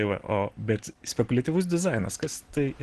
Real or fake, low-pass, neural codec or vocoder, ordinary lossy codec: real; 10.8 kHz; none; Opus, 16 kbps